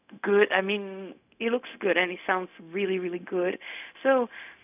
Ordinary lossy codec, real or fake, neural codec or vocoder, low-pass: none; fake; codec, 16 kHz, 0.4 kbps, LongCat-Audio-Codec; 3.6 kHz